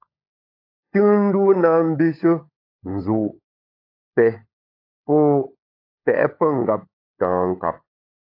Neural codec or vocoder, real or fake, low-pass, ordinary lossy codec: codec, 16 kHz, 16 kbps, FunCodec, trained on LibriTTS, 50 frames a second; fake; 5.4 kHz; AAC, 32 kbps